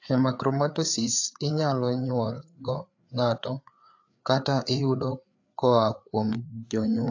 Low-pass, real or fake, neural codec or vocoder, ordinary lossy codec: 7.2 kHz; fake; codec, 16 kHz, 4 kbps, FreqCodec, larger model; AAC, 48 kbps